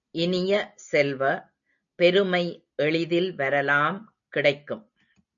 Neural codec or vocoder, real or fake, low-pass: none; real; 7.2 kHz